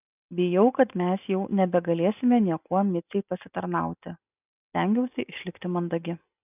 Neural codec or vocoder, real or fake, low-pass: none; real; 3.6 kHz